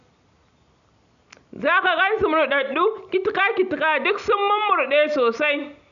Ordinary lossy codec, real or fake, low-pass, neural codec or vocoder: none; real; 7.2 kHz; none